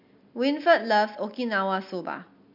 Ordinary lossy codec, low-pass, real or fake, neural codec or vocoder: MP3, 48 kbps; 5.4 kHz; real; none